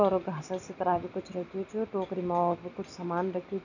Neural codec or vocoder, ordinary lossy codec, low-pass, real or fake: none; none; 7.2 kHz; real